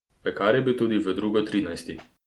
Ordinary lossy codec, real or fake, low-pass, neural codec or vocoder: Opus, 24 kbps; real; 19.8 kHz; none